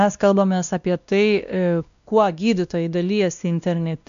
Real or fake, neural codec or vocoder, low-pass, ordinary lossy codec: fake; codec, 16 kHz, 1 kbps, X-Codec, WavLM features, trained on Multilingual LibriSpeech; 7.2 kHz; AAC, 96 kbps